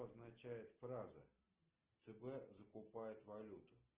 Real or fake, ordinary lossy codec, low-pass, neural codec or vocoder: real; Opus, 16 kbps; 3.6 kHz; none